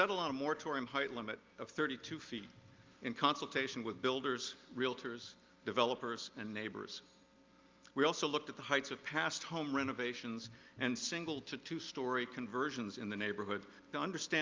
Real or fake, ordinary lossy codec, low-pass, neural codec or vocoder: real; Opus, 24 kbps; 7.2 kHz; none